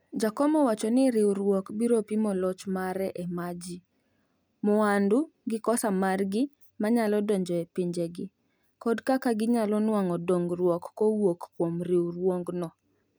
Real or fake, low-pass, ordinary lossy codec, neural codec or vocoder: real; none; none; none